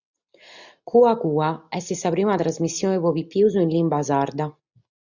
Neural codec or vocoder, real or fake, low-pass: none; real; 7.2 kHz